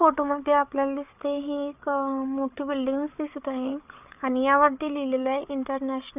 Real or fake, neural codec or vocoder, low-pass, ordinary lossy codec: fake; codec, 16 kHz, 8 kbps, FreqCodec, larger model; 3.6 kHz; none